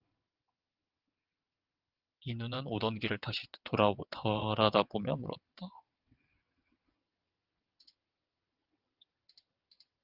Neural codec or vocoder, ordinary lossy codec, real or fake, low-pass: vocoder, 22.05 kHz, 80 mel bands, WaveNeXt; Opus, 24 kbps; fake; 5.4 kHz